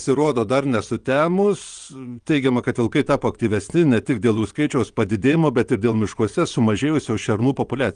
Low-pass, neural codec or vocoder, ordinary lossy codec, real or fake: 9.9 kHz; vocoder, 22.05 kHz, 80 mel bands, WaveNeXt; Opus, 32 kbps; fake